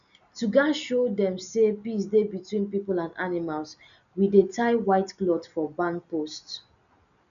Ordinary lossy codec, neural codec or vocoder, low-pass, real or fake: none; none; 7.2 kHz; real